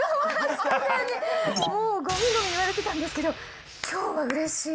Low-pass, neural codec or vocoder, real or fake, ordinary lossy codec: none; none; real; none